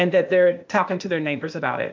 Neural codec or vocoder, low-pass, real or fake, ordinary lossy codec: codec, 16 kHz, 0.8 kbps, ZipCodec; 7.2 kHz; fake; MP3, 64 kbps